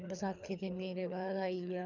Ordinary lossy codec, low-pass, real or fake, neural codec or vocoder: none; 7.2 kHz; fake; codec, 24 kHz, 3 kbps, HILCodec